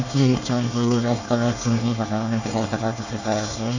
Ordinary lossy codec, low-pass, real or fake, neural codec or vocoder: none; 7.2 kHz; fake; codec, 24 kHz, 1 kbps, SNAC